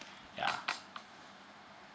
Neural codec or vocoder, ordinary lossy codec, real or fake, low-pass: none; none; real; none